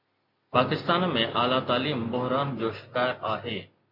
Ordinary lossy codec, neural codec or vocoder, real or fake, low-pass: MP3, 48 kbps; none; real; 5.4 kHz